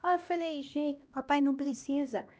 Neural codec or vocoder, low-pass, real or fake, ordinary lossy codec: codec, 16 kHz, 1 kbps, X-Codec, HuBERT features, trained on LibriSpeech; none; fake; none